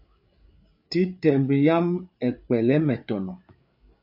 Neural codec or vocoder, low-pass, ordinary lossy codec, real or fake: codec, 16 kHz, 8 kbps, FreqCodec, larger model; 5.4 kHz; AAC, 48 kbps; fake